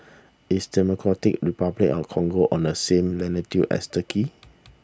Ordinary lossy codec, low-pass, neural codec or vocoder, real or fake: none; none; none; real